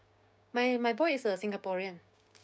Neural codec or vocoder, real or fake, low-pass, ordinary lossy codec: codec, 16 kHz, 6 kbps, DAC; fake; none; none